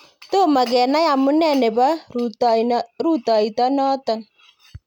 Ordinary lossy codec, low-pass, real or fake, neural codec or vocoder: none; 19.8 kHz; real; none